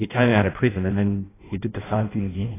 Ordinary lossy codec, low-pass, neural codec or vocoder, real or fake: AAC, 16 kbps; 3.6 kHz; codec, 16 kHz in and 24 kHz out, 0.6 kbps, FireRedTTS-2 codec; fake